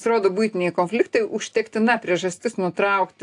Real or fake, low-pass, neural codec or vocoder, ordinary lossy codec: real; 10.8 kHz; none; AAC, 64 kbps